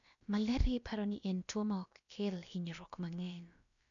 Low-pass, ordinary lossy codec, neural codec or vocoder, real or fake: 7.2 kHz; none; codec, 16 kHz, about 1 kbps, DyCAST, with the encoder's durations; fake